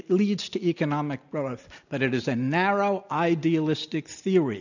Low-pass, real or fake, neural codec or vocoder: 7.2 kHz; real; none